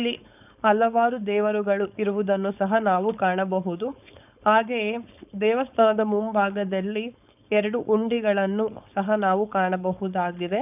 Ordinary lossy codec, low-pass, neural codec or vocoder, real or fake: none; 3.6 kHz; codec, 16 kHz, 4.8 kbps, FACodec; fake